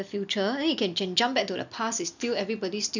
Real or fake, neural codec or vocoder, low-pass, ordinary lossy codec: real; none; 7.2 kHz; none